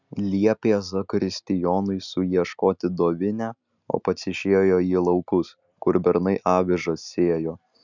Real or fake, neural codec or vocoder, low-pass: real; none; 7.2 kHz